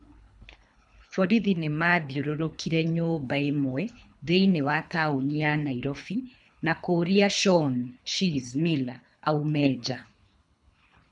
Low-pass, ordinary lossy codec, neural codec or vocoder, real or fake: 10.8 kHz; none; codec, 24 kHz, 3 kbps, HILCodec; fake